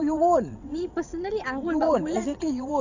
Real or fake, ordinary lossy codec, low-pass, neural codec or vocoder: fake; none; 7.2 kHz; vocoder, 22.05 kHz, 80 mel bands, WaveNeXt